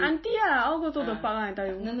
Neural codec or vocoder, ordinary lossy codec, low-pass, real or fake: none; MP3, 24 kbps; 7.2 kHz; real